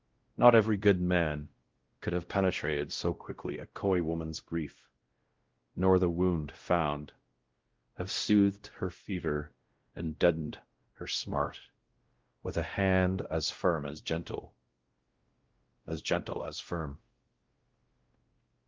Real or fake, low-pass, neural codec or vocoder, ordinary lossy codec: fake; 7.2 kHz; codec, 16 kHz, 0.5 kbps, X-Codec, WavLM features, trained on Multilingual LibriSpeech; Opus, 16 kbps